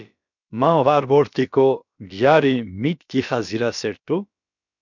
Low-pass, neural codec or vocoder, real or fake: 7.2 kHz; codec, 16 kHz, about 1 kbps, DyCAST, with the encoder's durations; fake